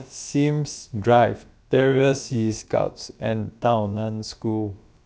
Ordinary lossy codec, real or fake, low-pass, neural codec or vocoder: none; fake; none; codec, 16 kHz, about 1 kbps, DyCAST, with the encoder's durations